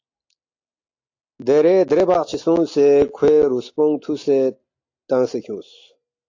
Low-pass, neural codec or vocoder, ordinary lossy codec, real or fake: 7.2 kHz; none; AAC, 48 kbps; real